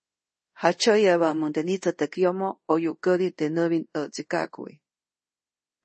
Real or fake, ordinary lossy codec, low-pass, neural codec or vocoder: fake; MP3, 32 kbps; 10.8 kHz; codec, 24 kHz, 0.5 kbps, DualCodec